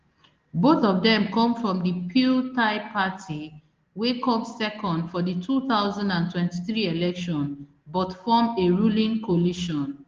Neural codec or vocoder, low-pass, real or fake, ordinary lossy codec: none; 7.2 kHz; real; Opus, 16 kbps